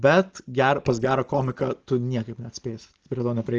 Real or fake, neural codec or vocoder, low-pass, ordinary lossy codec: real; none; 7.2 kHz; Opus, 16 kbps